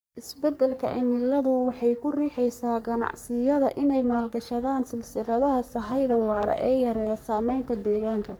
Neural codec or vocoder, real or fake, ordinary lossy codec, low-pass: codec, 44.1 kHz, 3.4 kbps, Pupu-Codec; fake; none; none